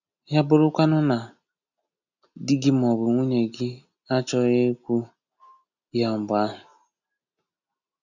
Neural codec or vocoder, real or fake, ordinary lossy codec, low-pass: none; real; none; 7.2 kHz